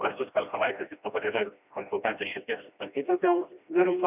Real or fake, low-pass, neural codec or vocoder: fake; 3.6 kHz; codec, 16 kHz, 1 kbps, FreqCodec, smaller model